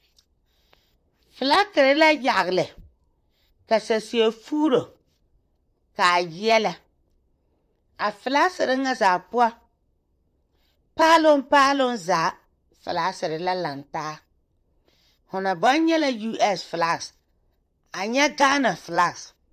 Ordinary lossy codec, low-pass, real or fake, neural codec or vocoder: AAC, 96 kbps; 14.4 kHz; fake; vocoder, 44.1 kHz, 128 mel bands, Pupu-Vocoder